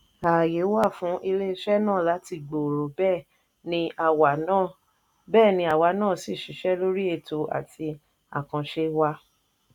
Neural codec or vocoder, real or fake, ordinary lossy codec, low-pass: none; real; none; 19.8 kHz